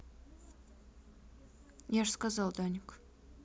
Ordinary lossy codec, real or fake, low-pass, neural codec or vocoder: none; real; none; none